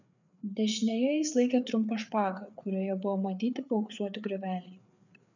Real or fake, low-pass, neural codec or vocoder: fake; 7.2 kHz; codec, 16 kHz, 4 kbps, FreqCodec, larger model